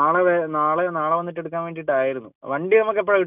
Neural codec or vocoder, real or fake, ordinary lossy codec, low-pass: none; real; none; 3.6 kHz